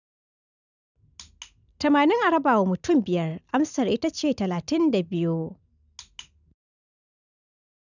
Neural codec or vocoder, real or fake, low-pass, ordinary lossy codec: none; real; 7.2 kHz; none